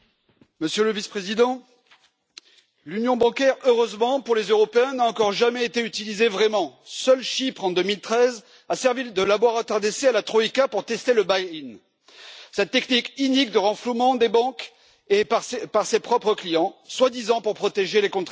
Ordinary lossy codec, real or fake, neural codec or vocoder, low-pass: none; real; none; none